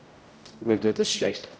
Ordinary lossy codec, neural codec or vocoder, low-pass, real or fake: none; codec, 16 kHz, 0.5 kbps, X-Codec, HuBERT features, trained on general audio; none; fake